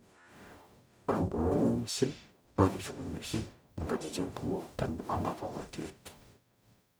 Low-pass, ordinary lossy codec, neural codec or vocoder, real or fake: none; none; codec, 44.1 kHz, 0.9 kbps, DAC; fake